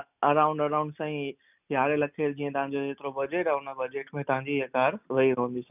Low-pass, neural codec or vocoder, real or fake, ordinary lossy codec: 3.6 kHz; none; real; none